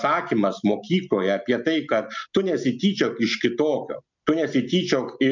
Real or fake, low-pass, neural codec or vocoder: real; 7.2 kHz; none